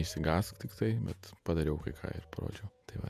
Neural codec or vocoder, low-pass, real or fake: vocoder, 48 kHz, 128 mel bands, Vocos; 14.4 kHz; fake